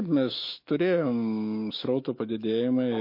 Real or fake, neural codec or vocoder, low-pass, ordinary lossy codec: fake; autoencoder, 48 kHz, 128 numbers a frame, DAC-VAE, trained on Japanese speech; 5.4 kHz; MP3, 32 kbps